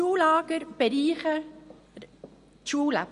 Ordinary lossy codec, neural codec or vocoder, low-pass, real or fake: MP3, 48 kbps; none; 10.8 kHz; real